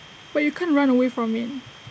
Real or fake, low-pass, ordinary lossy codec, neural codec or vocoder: real; none; none; none